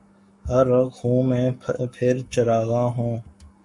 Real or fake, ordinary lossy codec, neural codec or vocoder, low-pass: fake; MP3, 64 kbps; codec, 44.1 kHz, 7.8 kbps, Pupu-Codec; 10.8 kHz